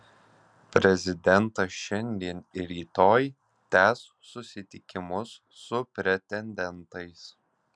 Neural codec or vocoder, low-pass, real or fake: none; 9.9 kHz; real